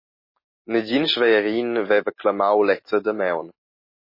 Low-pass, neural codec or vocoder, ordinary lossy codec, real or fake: 5.4 kHz; none; MP3, 24 kbps; real